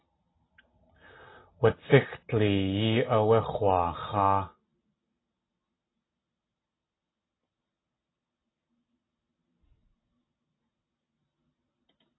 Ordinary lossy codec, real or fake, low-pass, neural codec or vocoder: AAC, 16 kbps; real; 7.2 kHz; none